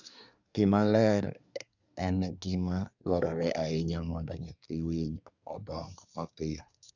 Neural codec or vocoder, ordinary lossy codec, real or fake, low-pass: codec, 24 kHz, 1 kbps, SNAC; none; fake; 7.2 kHz